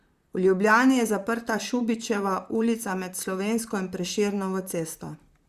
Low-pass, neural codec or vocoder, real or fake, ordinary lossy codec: 14.4 kHz; vocoder, 44.1 kHz, 128 mel bands, Pupu-Vocoder; fake; Opus, 64 kbps